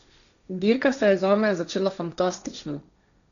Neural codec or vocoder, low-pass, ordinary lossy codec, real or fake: codec, 16 kHz, 1.1 kbps, Voila-Tokenizer; 7.2 kHz; none; fake